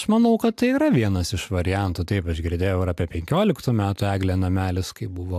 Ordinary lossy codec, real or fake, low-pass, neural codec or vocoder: AAC, 96 kbps; real; 14.4 kHz; none